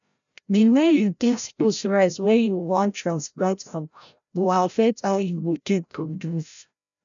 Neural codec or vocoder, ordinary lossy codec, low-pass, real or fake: codec, 16 kHz, 0.5 kbps, FreqCodec, larger model; none; 7.2 kHz; fake